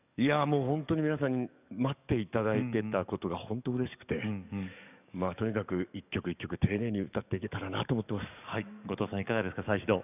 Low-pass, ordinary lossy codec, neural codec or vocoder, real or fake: 3.6 kHz; none; codec, 44.1 kHz, 7.8 kbps, DAC; fake